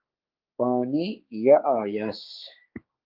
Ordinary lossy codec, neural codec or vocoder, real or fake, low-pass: Opus, 32 kbps; codec, 16 kHz, 4 kbps, X-Codec, HuBERT features, trained on general audio; fake; 5.4 kHz